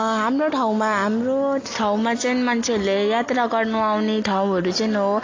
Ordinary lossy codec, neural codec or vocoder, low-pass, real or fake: AAC, 32 kbps; none; 7.2 kHz; real